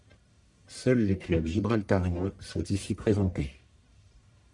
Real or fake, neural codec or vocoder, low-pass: fake; codec, 44.1 kHz, 1.7 kbps, Pupu-Codec; 10.8 kHz